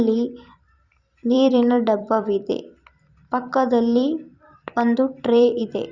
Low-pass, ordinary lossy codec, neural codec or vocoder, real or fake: 7.2 kHz; Opus, 64 kbps; vocoder, 44.1 kHz, 128 mel bands every 256 samples, BigVGAN v2; fake